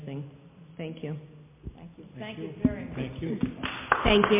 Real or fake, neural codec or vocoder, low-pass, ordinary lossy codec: real; none; 3.6 kHz; MP3, 24 kbps